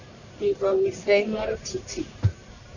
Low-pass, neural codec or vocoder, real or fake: 7.2 kHz; codec, 44.1 kHz, 1.7 kbps, Pupu-Codec; fake